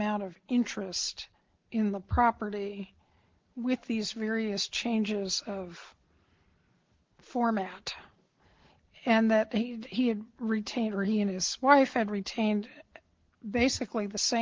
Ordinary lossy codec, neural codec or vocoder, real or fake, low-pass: Opus, 16 kbps; none; real; 7.2 kHz